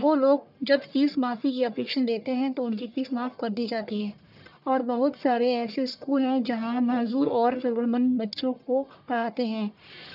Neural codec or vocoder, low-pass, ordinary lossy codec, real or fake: codec, 44.1 kHz, 1.7 kbps, Pupu-Codec; 5.4 kHz; none; fake